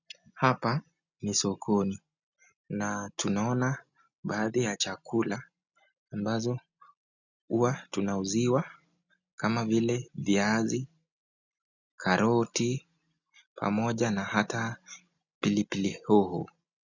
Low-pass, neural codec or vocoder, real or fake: 7.2 kHz; none; real